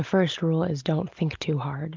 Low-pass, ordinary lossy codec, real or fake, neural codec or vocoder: 7.2 kHz; Opus, 32 kbps; real; none